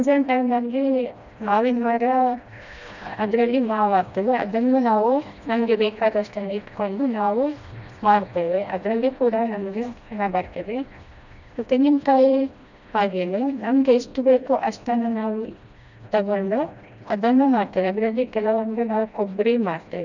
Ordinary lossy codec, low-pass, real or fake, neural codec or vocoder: none; 7.2 kHz; fake; codec, 16 kHz, 1 kbps, FreqCodec, smaller model